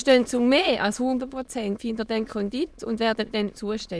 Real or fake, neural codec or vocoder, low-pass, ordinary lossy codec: fake; autoencoder, 22.05 kHz, a latent of 192 numbers a frame, VITS, trained on many speakers; none; none